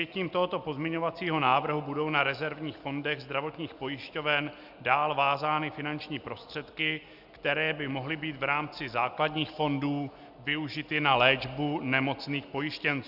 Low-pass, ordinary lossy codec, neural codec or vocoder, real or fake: 5.4 kHz; Opus, 64 kbps; none; real